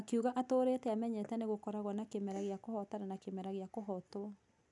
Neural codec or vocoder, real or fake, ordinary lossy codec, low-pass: none; real; none; 10.8 kHz